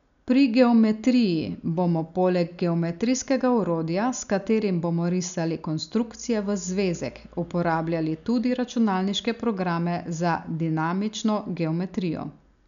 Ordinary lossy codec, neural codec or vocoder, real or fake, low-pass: none; none; real; 7.2 kHz